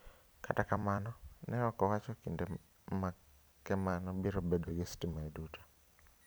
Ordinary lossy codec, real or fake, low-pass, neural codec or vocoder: none; fake; none; vocoder, 44.1 kHz, 128 mel bands every 256 samples, BigVGAN v2